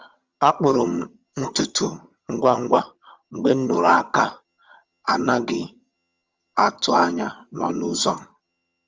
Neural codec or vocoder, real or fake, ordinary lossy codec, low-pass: vocoder, 22.05 kHz, 80 mel bands, HiFi-GAN; fake; Opus, 32 kbps; 7.2 kHz